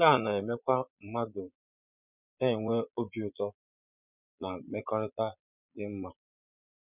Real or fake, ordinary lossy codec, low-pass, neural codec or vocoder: fake; none; 3.6 kHz; vocoder, 22.05 kHz, 80 mel bands, Vocos